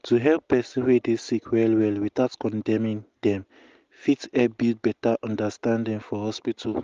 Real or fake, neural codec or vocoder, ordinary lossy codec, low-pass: real; none; Opus, 32 kbps; 7.2 kHz